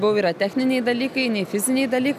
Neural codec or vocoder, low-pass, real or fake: none; 14.4 kHz; real